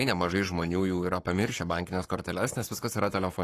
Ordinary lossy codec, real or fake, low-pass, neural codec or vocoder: AAC, 48 kbps; fake; 14.4 kHz; codec, 44.1 kHz, 7.8 kbps, Pupu-Codec